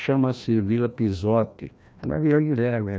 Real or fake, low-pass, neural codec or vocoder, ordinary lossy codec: fake; none; codec, 16 kHz, 1 kbps, FreqCodec, larger model; none